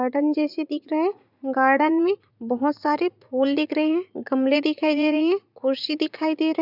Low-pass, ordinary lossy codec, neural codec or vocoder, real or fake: 5.4 kHz; none; vocoder, 44.1 kHz, 80 mel bands, Vocos; fake